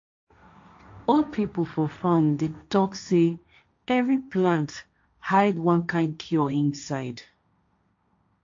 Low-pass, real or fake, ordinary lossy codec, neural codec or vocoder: 7.2 kHz; fake; AAC, 48 kbps; codec, 16 kHz, 1.1 kbps, Voila-Tokenizer